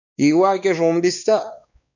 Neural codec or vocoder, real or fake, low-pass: codec, 16 kHz, 2 kbps, X-Codec, WavLM features, trained on Multilingual LibriSpeech; fake; 7.2 kHz